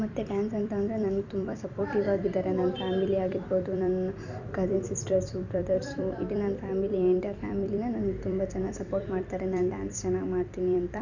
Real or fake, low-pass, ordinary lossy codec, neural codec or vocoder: fake; 7.2 kHz; none; vocoder, 44.1 kHz, 128 mel bands every 256 samples, BigVGAN v2